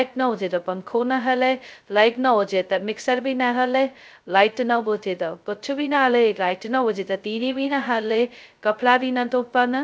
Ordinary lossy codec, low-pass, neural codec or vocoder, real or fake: none; none; codec, 16 kHz, 0.2 kbps, FocalCodec; fake